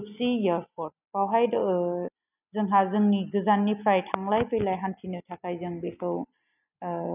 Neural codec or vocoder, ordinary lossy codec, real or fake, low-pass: none; none; real; 3.6 kHz